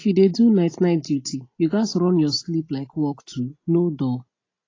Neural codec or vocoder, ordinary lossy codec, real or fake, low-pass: none; AAC, 32 kbps; real; 7.2 kHz